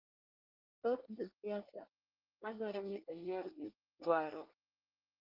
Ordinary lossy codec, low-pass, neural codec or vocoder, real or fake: Opus, 24 kbps; 5.4 kHz; codec, 24 kHz, 1 kbps, SNAC; fake